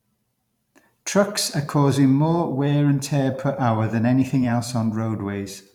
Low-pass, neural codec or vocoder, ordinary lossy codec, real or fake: 19.8 kHz; vocoder, 44.1 kHz, 128 mel bands every 512 samples, BigVGAN v2; none; fake